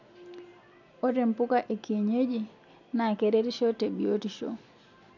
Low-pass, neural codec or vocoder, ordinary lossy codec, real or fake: 7.2 kHz; none; none; real